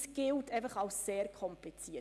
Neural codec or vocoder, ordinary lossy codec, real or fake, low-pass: none; none; real; none